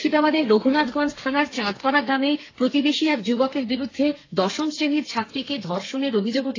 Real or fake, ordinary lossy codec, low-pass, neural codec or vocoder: fake; AAC, 32 kbps; 7.2 kHz; codec, 32 kHz, 1.9 kbps, SNAC